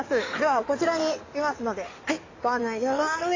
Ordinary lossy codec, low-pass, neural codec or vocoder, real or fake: AAC, 32 kbps; 7.2 kHz; codec, 16 kHz in and 24 kHz out, 2.2 kbps, FireRedTTS-2 codec; fake